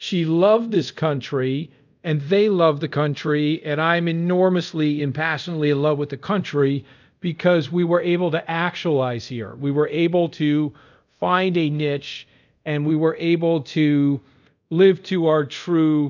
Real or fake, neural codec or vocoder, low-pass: fake; codec, 24 kHz, 0.5 kbps, DualCodec; 7.2 kHz